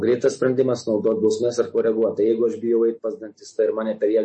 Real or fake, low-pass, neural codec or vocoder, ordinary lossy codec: real; 10.8 kHz; none; MP3, 32 kbps